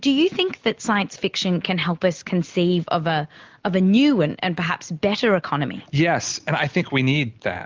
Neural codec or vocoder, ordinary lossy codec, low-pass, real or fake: none; Opus, 32 kbps; 7.2 kHz; real